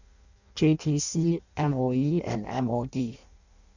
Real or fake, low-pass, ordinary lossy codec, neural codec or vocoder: fake; 7.2 kHz; none; codec, 16 kHz in and 24 kHz out, 0.6 kbps, FireRedTTS-2 codec